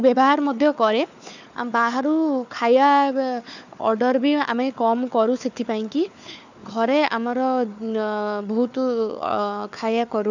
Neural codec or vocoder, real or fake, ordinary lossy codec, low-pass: codec, 16 kHz, 4 kbps, FunCodec, trained on Chinese and English, 50 frames a second; fake; none; 7.2 kHz